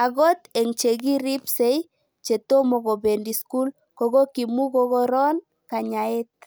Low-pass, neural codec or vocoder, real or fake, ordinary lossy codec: none; none; real; none